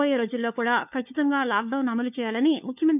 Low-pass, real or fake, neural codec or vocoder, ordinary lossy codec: 3.6 kHz; fake; codec, 16 kHz, 4 kbps, FunCodec, trained on LibriTTS, 50 frames a second; none